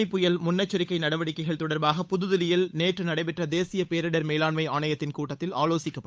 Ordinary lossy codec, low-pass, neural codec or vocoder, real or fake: none; none; codec, 16 kHz, 8 kbps, FunCodec, trained on Chinese and English, 25 frames a second; fake